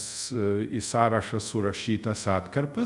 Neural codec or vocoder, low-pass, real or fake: codec, 24 kHz, 0.5 kbps, DualCodec; 10.8 kHz; fake